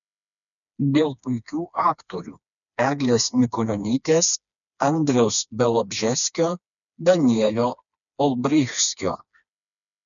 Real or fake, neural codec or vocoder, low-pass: fake; codec, 16 kHz, 2 kbps, FreqCodec, smaller model; 7.2 kHz